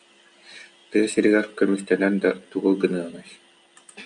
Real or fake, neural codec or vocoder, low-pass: real; none; 9.9 kHz